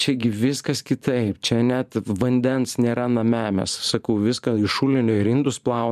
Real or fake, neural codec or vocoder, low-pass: fake; vocoder, 44.1 kHz, 128 mel bands every 512 samples, BigVGAN v2; 14.4 kHz